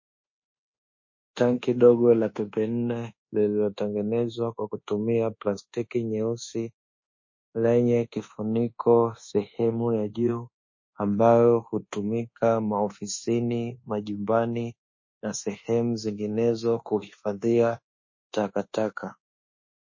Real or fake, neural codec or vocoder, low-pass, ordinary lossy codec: fake; codec, 24 kHz, 1.2 kbps, DualCodec; 7.2 kHz; MP3, 32 kbps